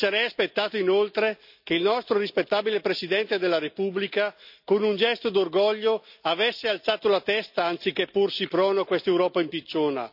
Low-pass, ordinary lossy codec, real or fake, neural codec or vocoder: 5.4 kHz; none; real; none